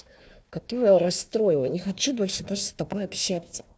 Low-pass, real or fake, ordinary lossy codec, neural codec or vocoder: none; fake; none; codec, 16 kHz, 1 kbps, FunCodec, trained on Chinese and English, 50 frames a second